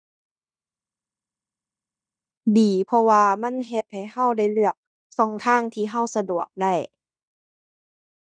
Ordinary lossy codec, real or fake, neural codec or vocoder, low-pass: none; fake; codec, 16 kHz in and 24 kHz out, 0.9 kbps, LongCat-Audio-Codec, fine tuned four codebook decoder; 9.9 kHz